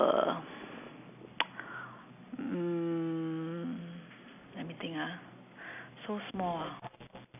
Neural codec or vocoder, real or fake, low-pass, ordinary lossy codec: none; real; 3.6 kHz; none